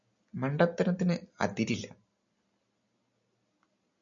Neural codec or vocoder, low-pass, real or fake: none; 7.2 kHz; real